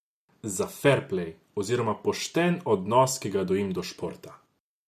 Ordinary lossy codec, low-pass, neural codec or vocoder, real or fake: none; 14.4 kHz; none; real